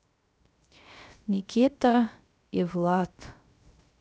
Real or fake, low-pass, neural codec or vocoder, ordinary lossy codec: fake; none; codec, 16 kHz, 0.3 kbps, FocalCodec; none